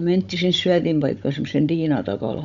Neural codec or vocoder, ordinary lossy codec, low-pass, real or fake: codec, 16 kHz, 16 kbps, FunCodec, trained on Chinese and English, 50 frames a second; none; 7.2 kHz; fake